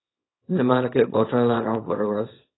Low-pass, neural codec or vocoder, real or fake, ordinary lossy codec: 7.2 kHz; codec, 24 kHz, 0.9 kbps, WavTokenizer, small release; fake; AAC, 16 kbps